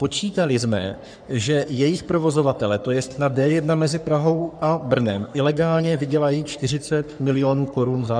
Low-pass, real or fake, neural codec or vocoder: 9.9 kHz; fake; codec, 44.1 kHz, 3.4 kbps, Pupu-Codec